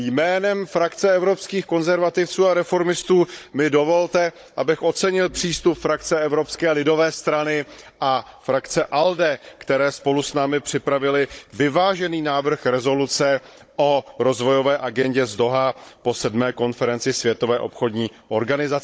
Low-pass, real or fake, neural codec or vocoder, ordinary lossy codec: none; fake; codec, 16 kHz, 16 kbps, FunCodec, trained on Chinese and English, 50 frames a second; none